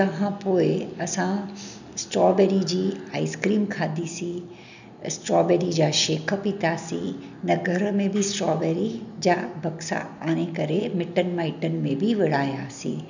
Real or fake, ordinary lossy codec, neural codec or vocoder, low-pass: real; none; none; 7.2 kHz